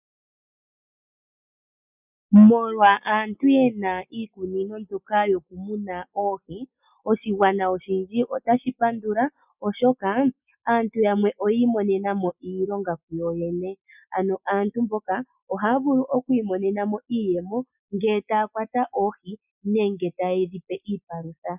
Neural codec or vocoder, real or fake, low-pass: none; real; 3.6 kHz